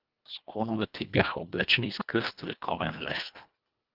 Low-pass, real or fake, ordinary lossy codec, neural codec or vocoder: 5.4 kHz; fake; Opus, 24 kbps; codec, 24 kHz, 1.5 kbps, HILCodec